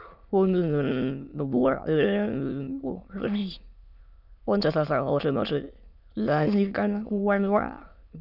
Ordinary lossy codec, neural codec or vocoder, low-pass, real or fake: none; autoencoder, 22.05 kHz, a latent of 192 numbers a frame, VITS, trained on many speakers; 5.4 kHz; fake